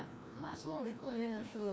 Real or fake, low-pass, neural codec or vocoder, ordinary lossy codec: fake; none; codec, 16 kHz, 1 kbps, FreqCodec, larger model; none